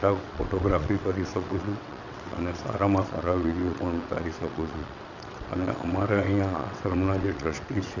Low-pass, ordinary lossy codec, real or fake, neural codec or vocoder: 7.2 kHz; none; fake; vocoder, 22.05 kHz, 80 mel bands, WaveNeXt